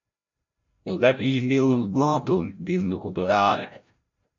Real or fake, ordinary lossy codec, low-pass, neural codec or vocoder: fake; MP3, 48 kbps; 7.2 kHz; codec, 16 kHz, 0.5 kbps, FreqCodec, larger model